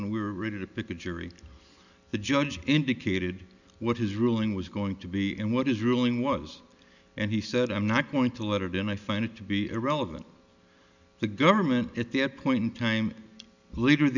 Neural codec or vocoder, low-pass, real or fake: none; 7.2 kHz; real